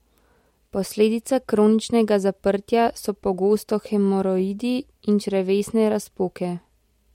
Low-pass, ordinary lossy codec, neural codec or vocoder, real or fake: 19.8 kHz; MP3, 64 kbps; none; real